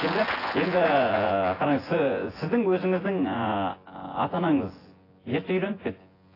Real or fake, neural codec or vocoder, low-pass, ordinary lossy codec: fake; vocoder, 24 kHz, 100 mel bands, Vocos; 5.4 kHz; AAC, 24 kbps